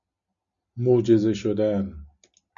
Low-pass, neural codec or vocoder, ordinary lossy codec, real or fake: 7.2 kHz; none; MP3, 64 kbps; real